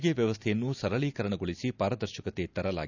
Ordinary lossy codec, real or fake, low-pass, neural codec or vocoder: none; real; 7.2 kHz; none